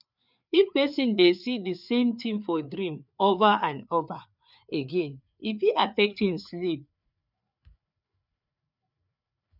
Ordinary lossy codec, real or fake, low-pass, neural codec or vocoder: none; fake; 5.4 kHz; codec, 16 kHz, 4 kbps, FreqCodec, larger model